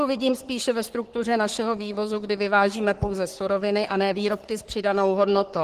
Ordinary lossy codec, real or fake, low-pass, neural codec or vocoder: Opus, 16 kbps; fake; 14.4 kHz; codec, 44.1 kHz, 3.4 kbps, Pupu-Codec